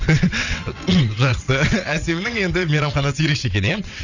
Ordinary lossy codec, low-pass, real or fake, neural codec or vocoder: none; 7.2 kHz; fake; vocoder, 22.05 kHz, 80 mel bands, WaveNeXt